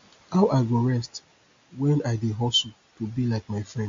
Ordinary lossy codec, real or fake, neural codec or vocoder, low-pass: AAC, 48 kbps; real; none; 7.2 kHz